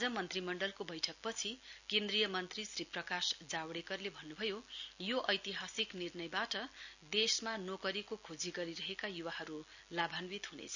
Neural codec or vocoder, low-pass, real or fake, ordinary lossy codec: none; 7.2 kHz; real; none